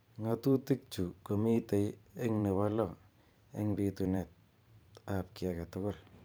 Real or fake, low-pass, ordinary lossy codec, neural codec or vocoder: fake; none; none; vocoder, 44.1 kHz, 128 mel bands every 512 samples, BigVGAN v2